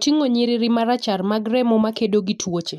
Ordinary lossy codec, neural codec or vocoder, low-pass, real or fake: MP3, 96 kbps; none; 14.4 kHz; real